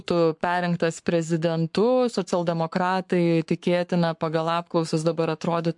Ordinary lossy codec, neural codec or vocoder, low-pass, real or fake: MP3, 64 kbps; codec, 44.1 kHz, 7.8 kbps, Pupu-Codec; 10.8 kHz; fake